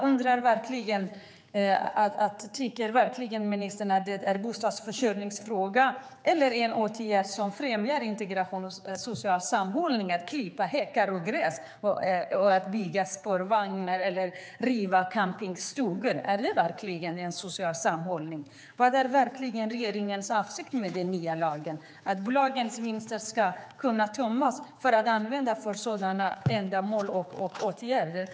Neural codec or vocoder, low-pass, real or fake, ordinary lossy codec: codec, 16 kHz, 4 kbps, X-Codec, HuBERT features, trained on general audio; none; fake; none